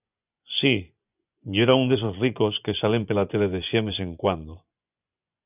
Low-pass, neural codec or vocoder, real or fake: 3.6 kHz; vocoder, 24 kHz, 100 mel bands, Vocos; fake